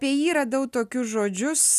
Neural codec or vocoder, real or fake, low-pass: none; real; 14.4 kHz